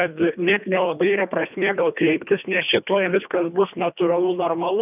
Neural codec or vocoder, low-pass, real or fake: codec, 24 kHz, 1.5 kbps, HILCodec; 3.6 kHz; fake